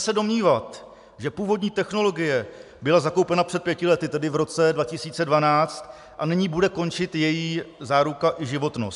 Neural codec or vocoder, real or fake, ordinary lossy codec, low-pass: none; real; AAC, 96 kbps; 10.8 kHz